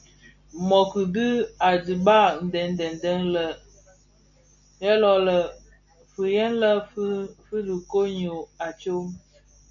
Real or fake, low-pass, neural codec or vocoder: real; 7.2 kHz; none